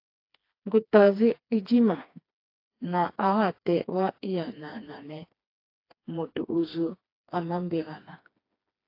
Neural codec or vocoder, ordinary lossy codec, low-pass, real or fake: codec, 16 kHz, 2 kbps, FreqCodec, smaller model; AAC, 32 kbps; 5.4 kHz; fake